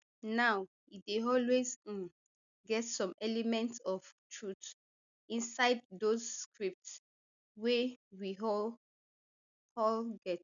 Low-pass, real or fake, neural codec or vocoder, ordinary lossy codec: 7.2 kHz; real; none; none